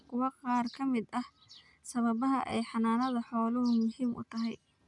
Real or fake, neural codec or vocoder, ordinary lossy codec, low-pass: real; none; none; 10.8 kHz